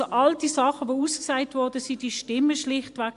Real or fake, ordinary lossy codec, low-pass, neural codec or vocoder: real; AAC, 64 kbps; 10.8 kHz; none